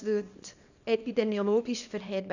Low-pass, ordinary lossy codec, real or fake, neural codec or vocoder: 7.2 kHz; none; fake; codec, 24 kHz, 0.9 kbps, WavTokenizer, small release